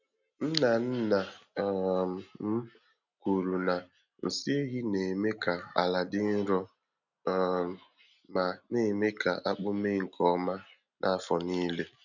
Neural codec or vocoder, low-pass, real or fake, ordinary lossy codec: none; 7.2 kHz; real; none